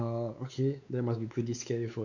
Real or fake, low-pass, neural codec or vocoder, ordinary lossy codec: fake; 7.2 kHz; codec, 16 kHz, 4 kbps, X-Codec, WavLM features, trained on Multilingual LibriSpeech; none